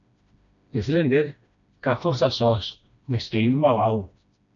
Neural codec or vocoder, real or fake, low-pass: codec, 16 kHz, 1 kbps, FreqCodec, smaller model; fake; 7.2 kHz